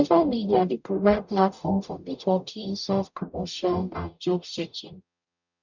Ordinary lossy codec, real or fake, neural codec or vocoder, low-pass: none; fake; codec, 44.1 kHz, 0.9 kbps, DAC; 7.2 kHz